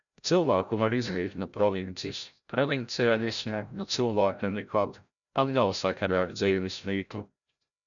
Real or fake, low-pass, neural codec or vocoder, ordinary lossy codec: fake; 7.2 kHz; codec, 16 kHz, 0.5 kbps, FreqCodec, larger model; MP3, 96 kbps